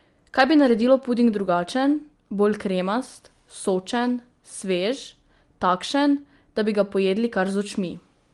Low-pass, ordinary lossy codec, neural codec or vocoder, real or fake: 10.8 kHz; Opus, 24 kbps; none; real